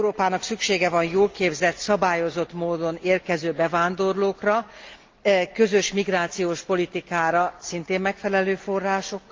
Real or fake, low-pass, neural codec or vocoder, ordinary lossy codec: real; 7.2 kHz; none; Opus, 32 kbps